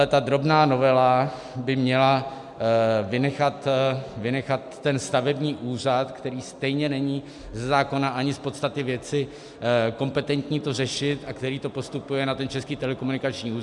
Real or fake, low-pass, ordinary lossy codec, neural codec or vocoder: real; 10.8 kHz; MP3, 96 kbps; none